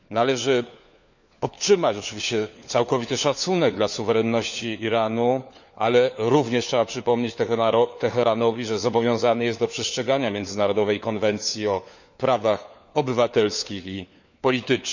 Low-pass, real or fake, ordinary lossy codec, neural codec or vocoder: 7.2 kHz; fake; none; codec, 16 kHz, 4 kbps, FunCodec, trained on LibriTTS, 50 frames a second